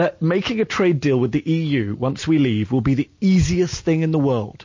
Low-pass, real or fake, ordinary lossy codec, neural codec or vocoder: 7.2 kHz; real; MP3, 32 kbps; none